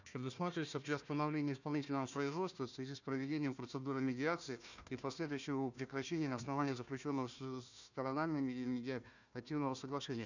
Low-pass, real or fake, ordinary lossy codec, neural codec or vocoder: 7.2 kHz; fake; none; codec, 16 kHz, 1 kbps, FunCodec, trained on Chinese and English, 50 frames a second